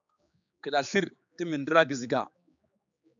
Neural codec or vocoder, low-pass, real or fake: codec, 16 kHz, 4 kbps, X-Codec, HuBERT features, trained on general audio; 7.2 kHz; fake